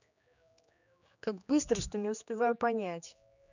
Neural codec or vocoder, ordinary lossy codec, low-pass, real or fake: codec, 16 kHz, 2 kbps, X-Codec, HuBERT features, trained on general audio; none; 7.2 kHz; fake